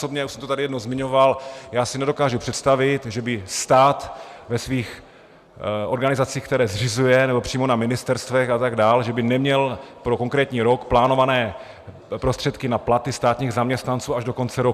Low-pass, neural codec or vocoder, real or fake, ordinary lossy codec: 14.4 kHz; none; real; Opus, 64 kbps